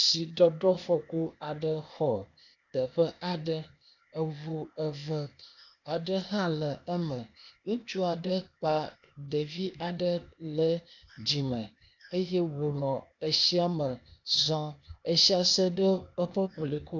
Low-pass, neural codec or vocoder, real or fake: 7.2 kHz; codec, 16 kHz, 0.8 kbps, ZipCodec; fake